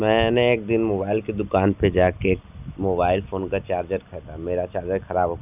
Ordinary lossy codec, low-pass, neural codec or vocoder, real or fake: none; 3.6 kHz; none; real